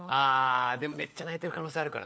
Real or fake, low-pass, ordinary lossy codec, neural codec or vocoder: fake; none; none; codec, 16 kHz, 4 kbps, FunCodec, trained on LibriTTS, 50 frames a second